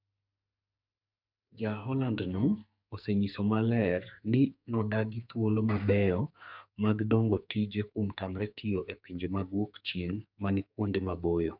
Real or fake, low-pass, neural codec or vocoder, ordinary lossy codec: fake; 5.4 kHz; codec, 44.1 kHz, 2.6 kbps, SNAC; none